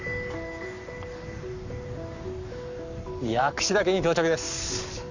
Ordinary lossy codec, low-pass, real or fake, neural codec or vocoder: none; 7.2 kHz; fake; codec, 44.1 kHz, 7.8 kbps, Pupu-Codec